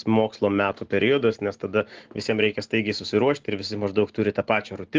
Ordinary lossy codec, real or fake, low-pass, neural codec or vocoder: Opus, 16 kbps; real; 7.2 kHz; none